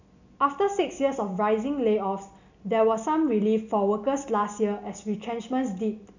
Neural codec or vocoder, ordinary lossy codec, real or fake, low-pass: none; none; real; 7.2 kHz